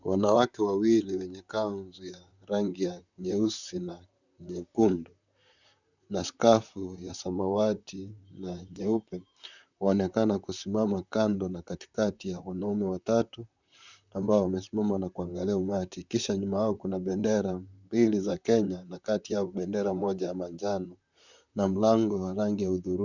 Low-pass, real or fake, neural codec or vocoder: 7.2 kHz; fake; vocoder, 44.1 kHz, 128 mel bands, Pupu-Vocoder